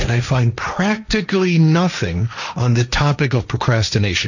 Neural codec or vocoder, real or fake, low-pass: codec, 16 kHz, 1.1 kbps, Voila-Tokenizer; fake; 7.2 kHz